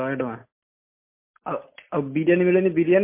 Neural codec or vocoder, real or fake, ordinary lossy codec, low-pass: none; real; MP3, 24 kbps; 3.6 kHz